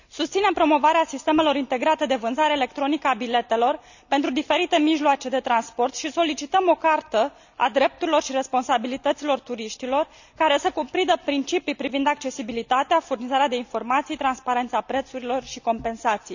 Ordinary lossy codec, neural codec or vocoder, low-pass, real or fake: none; none; 7.2 kHz; real